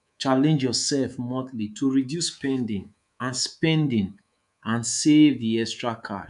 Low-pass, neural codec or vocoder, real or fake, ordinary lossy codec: 10.8 kHz; codec, 24 kHz, 3.1 kbps, DualCodec; fake; none